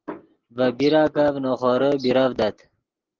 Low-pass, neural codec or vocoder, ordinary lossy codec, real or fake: 7.2 kHz; none; Opus, 16 kbps; real